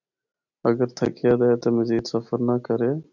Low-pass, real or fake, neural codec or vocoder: 7.2 kHz; real; none